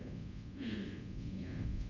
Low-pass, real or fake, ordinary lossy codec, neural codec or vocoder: 7.2 kHz; fake; MP3, 48 kbps; codec, 24 kHz, 0.5 kbps, DualCodec